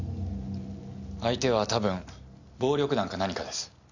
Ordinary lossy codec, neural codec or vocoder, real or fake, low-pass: none; none; real; 7.2 kHz